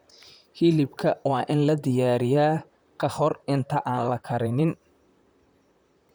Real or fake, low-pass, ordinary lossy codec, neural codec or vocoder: fake; none; none; vocoder, 44.1 kHz, 128 mel bands, Pupu-Vocoder